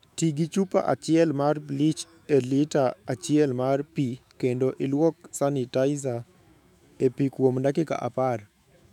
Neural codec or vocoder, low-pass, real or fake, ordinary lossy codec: autoencoder, 48 kHz, 128 numbers a frame, DAC-VAE, trained on Japanese speech; 19.8 kHz; fake; none